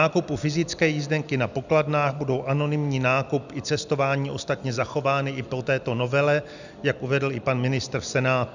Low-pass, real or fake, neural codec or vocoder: 7.2 kHz; real; none